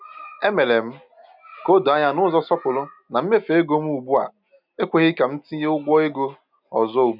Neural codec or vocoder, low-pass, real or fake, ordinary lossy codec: none; 5.4 kHz; real; none